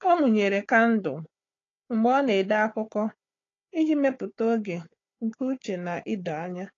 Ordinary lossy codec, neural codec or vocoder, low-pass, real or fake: AAC, 32 kbps; codec, 16 kHz, 4 kbps, FunCodec, trained on Chinese and English, 50 frames a second; 7.2 kHz; fake